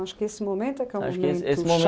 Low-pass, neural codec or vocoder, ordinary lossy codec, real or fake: none; none; none; real